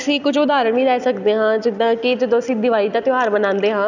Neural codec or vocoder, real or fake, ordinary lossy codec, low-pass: none; real; none; 7.2 kHz